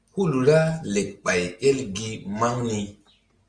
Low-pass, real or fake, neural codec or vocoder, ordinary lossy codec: 9.9 kHz; real; none; Opus, 32 kbps